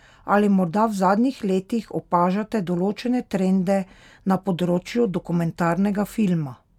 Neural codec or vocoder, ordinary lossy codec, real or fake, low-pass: none; none; real; 19.8 kHz